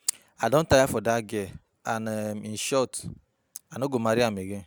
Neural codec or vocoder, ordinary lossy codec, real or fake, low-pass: none; none; real; none